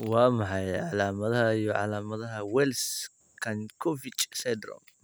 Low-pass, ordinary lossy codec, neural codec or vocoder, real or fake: none; none; none; real